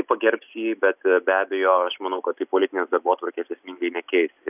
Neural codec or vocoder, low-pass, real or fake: none; 3.6 kHz; real